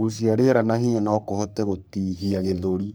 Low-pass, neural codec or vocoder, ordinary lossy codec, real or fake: none; codec, 44.1 kHz, 3.4 kbps, Pupu-Codec; none; fake